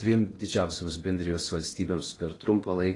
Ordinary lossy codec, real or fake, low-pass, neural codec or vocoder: AAC, 32 kbps; fake; 10.8 kHz; codec, 16 kHz in and 24 kHz out, 0.8 kbps, FocalCodec, streaming, 65536 codes